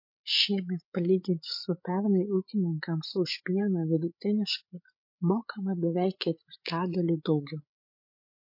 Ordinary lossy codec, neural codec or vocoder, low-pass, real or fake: MP3, 32 kbps; codec, 16 kHz, 8 kbps, FreqCodec, larger model; 5.4 kHz; fake